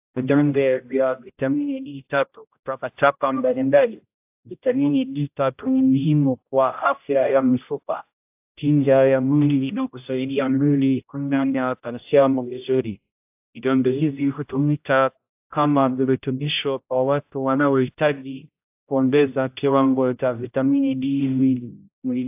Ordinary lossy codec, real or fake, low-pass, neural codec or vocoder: AAC, 32 kbps; fake; 3.6 kHz; codec, 16 kHz, 0.5 kbps, X-Codec, HuBERT features, trained on general audio